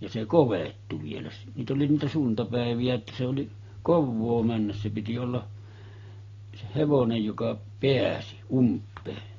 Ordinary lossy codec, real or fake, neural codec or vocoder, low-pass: AAC, 32 kbps; real; none; 7.2 kHz